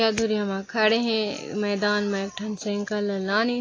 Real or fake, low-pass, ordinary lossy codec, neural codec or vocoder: real; 7.2 kHz; AAC, 32 kbps; none